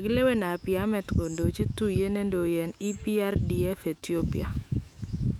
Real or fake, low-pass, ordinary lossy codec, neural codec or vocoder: fake; 19.8 kHz; none; autoencoder, 48 kHz, 128 numbers a frame, DAC-VAE, trained on Japanese speech